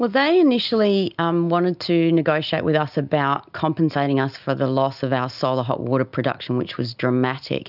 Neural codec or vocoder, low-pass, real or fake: none; 5.4 kHz; real